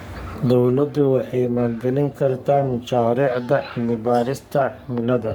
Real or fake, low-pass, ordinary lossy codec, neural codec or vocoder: fake; none; none; codec, 44.1 kHz, 3.4 kbps, Pupu-Codec